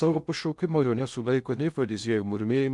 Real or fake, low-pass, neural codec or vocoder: fake; 10.8 kHz; codec, 16 kHz in and 24 kHz out, 0.6 kbps, FocalCodec, streaming, 2048 codes